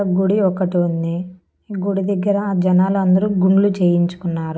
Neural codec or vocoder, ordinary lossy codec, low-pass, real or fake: none; none; none; real